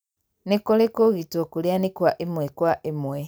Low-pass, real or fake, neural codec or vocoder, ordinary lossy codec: none; real; none; none